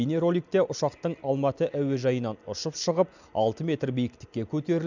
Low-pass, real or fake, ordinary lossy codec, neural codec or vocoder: 7.2 kHz; real; none; none